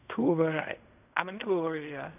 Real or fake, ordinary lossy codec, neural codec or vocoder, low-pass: fake; none; codec, 16 kHz in and 24 kHz out, 0.4 kbps, LongCat-Audio-Codec, fine tuned four codebook decoder; 3.6 kHz